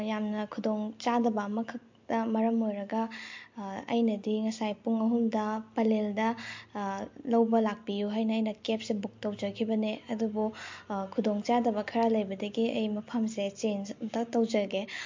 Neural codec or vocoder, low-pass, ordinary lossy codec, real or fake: none; 7.2 kHz; MP3, 48 kbps; real